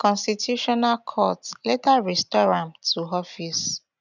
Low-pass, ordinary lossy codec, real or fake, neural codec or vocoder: 7.2 kHz; none; real; none